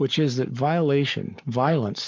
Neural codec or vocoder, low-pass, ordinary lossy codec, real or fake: codec, 44.1 kHz, 7.8 kbps, Pupu-Codec; 7.2 kHz; MP3, 64 kbps; fake